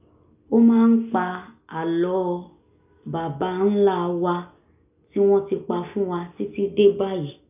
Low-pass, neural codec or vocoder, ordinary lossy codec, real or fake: 3.6 kHz; none; none; real